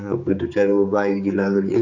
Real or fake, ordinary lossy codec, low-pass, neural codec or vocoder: fake; none; 7.2 kHz; codec, 16 kHz, 4 kbps, X-Codec, HuBERT features, trained on general audio